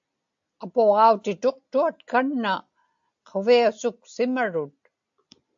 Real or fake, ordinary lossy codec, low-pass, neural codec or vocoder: real; AAC, 64 kbps; 7.2 kHz; none